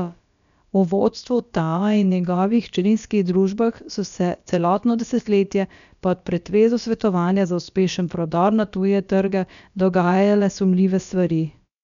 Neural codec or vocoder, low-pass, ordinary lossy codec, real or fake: codec, 16 kHz, about 1 kbps, DyCAST, with the encoder's durations; 7.2 kHz; none; fake